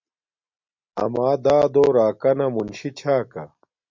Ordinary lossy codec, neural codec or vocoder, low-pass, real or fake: MP3, 48 kbps; none; 7.2 kHz; real